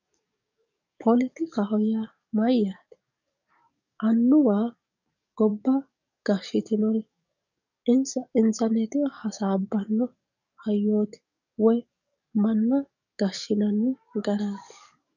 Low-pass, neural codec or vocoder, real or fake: 7.2 kHz; codec, 44.1 kHz, 7.8 kbps, DAC; fake